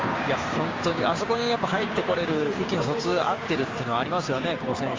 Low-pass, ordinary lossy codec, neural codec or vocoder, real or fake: 7.2 kHz; Opus, 32 kbps; codec, 16 kHz, 6 kbps, DAC; fake